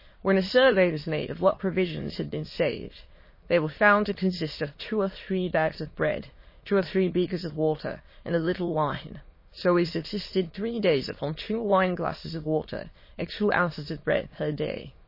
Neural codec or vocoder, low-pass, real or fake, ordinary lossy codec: autoencoder, 22.05 kHz, a latent of 192 numbers a frame, VITS, trained on many speakers; 5.4 kHz; fake; MP3, 24 kbps